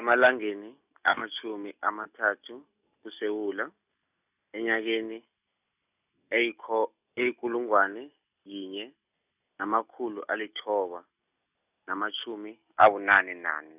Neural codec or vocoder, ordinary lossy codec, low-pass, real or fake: none; none; 3.6 kHz; real